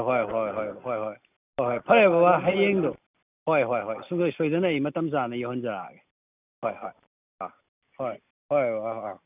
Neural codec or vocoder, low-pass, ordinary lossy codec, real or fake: none; 3.6 kHz; none; real